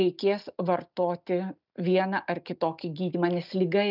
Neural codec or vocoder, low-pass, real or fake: none; 5.4 kHz; real